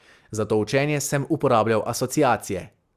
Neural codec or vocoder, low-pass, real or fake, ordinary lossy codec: none; 14.4 kHz; real; Opus, 64 kbps